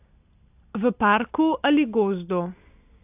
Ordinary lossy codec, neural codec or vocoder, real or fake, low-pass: AAC, 32 kbps; none; real; 3.6 kHz